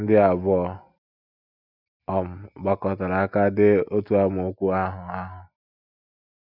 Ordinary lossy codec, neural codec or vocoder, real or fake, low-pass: none; none; real; 5.4 kHz